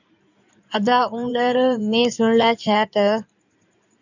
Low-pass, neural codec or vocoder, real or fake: 7.2 kHz; codec, 16 kHz in and 24 kHz out, 2.2 kbps, FireRedTTS-2 codec; fake